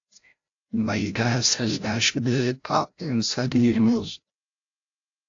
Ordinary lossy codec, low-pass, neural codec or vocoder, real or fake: AAC, 48 kbps; 7.2 kHz; codec, 16 kHz, 0.5 kbps, FreqCodec, larger model; fake